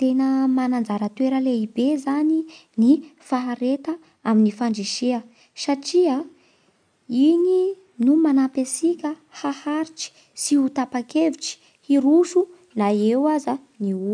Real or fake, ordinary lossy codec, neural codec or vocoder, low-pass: real; none; none; 9.9 kHz